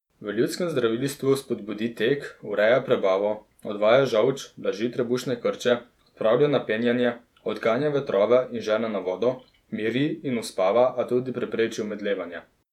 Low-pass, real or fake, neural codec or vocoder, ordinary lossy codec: 19.8 kHz; fake; vocoder, 48 kHz, 128 mel bands, Vocos; none